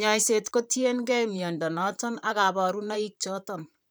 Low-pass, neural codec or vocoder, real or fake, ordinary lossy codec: none; vocoder, 44.1 kHz, 128 mel bands, Pupu-Vocoder; fake; none